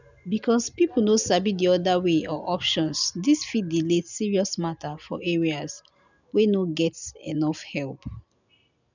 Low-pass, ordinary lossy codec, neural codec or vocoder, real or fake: 7.2 kHz; none; none; real